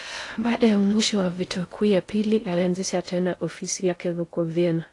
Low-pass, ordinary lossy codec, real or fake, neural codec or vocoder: 10.8 kHz; AAC, 48 kbps; fake; codec, 16 kHz in and 24 kHz out, 0.6 kbps, FocalCodec, streaming, 2048 codes